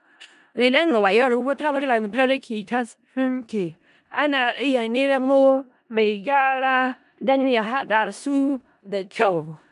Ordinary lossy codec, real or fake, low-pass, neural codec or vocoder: none; fake; 10.8 kHz; codec, 16 kHz in and 24 kHz out, 0.4 kbps, LongCat-Audio-Codec, four codebook decoder